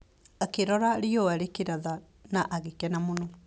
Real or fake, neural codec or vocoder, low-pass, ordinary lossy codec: real; none; none; none